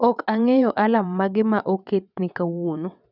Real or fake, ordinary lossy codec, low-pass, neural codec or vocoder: fake; none; 5.4 kHz; vocoder, 22.05 kHz, 80 mel bands, WaveNeXt